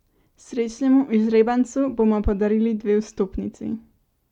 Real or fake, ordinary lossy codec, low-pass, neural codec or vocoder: real; none; 19.8 kHz; none